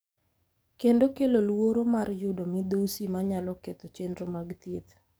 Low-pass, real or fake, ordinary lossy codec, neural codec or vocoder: none; fake; none; codec, 44.1 kHz, 7.8 kbps, DAC